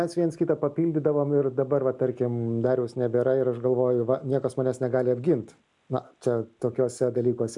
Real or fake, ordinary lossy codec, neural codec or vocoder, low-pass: real; MP3, 96 kbps; none; 10.8 kHz